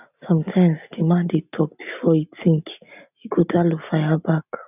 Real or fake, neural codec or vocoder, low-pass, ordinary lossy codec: real; none; 3.6 kHz; none